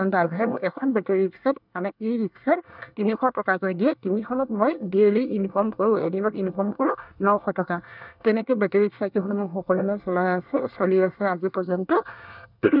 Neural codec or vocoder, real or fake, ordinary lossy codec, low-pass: codec, 24 kHz, 1 kbps, SNAC; fake; none; 5.4 kHz